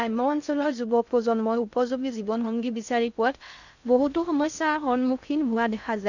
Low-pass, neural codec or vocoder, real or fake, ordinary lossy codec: 7.2 kHz; codec, 16 kHz in and 24 kHz out, 0.6 kbps, FocalCodec, streaming, 2048 codes; fake; none